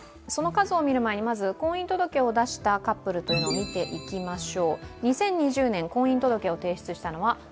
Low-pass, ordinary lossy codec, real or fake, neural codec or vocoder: none; none; real; none